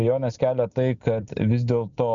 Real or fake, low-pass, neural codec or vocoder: real; 7.2 kHz; none